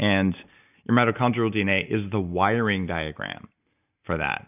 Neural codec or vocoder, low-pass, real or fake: none; 3.6 kHz; real